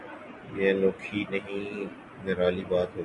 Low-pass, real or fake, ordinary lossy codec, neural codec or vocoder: 10.8 kHz; real; MP3, 64 kbps; none